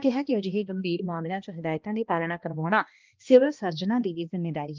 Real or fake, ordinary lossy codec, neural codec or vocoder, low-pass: fake; Opus, 24 kbps; codec, 16 kHz, 1 kbps, X-Codec, HuBERT features, trained on balanced general audio; 7.2 kHz